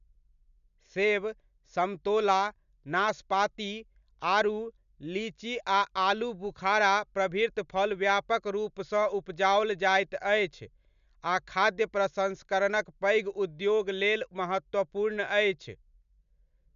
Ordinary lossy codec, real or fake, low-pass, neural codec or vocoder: MP3, 96 kbps; real; 7.2 kHz; none